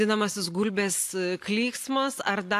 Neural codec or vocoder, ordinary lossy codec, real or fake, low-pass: none; AAC, 64 kbps; real; 14.4 kHz